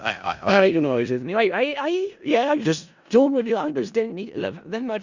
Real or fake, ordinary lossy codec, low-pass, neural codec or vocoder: fake; Opus, 64 kbps; 7.2 kHz; codec, 16 kHz in and 24 kHz out, 0.4 kbps, LongCat-Audio-Codec, four codebook decoder